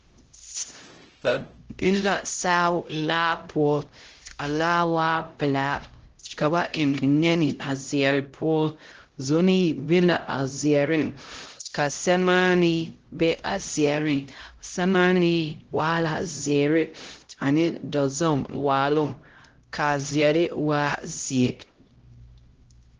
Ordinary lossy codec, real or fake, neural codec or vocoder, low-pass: Opus, 16 kbps; fake; codec, 16 kHz, 0.5 kbps, X-Codec, HuBERT features, trained on LibriSpeech; 7.2 kHz